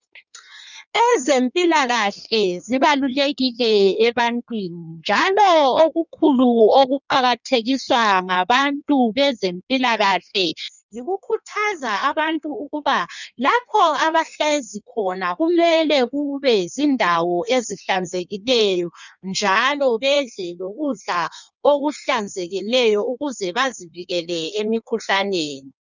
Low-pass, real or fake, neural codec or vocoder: 7.2 kHz; fake; codec, 16 kHz in and 24 kHz out, 1.1 kbps, FireRedTTS-2 codec